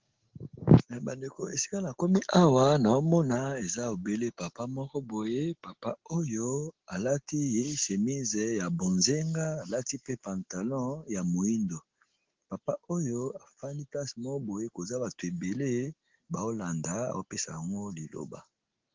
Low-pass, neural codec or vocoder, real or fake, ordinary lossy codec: 7.2 kHz; none; real; Opus, 16 kbps